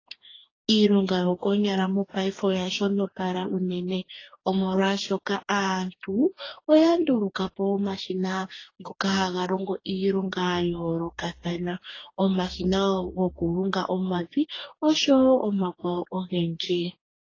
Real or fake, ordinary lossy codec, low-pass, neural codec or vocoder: fake; AAC, 32 kbps; 7.2 kHz; codec, 44.1 kHz, 2.6 kbps, DAC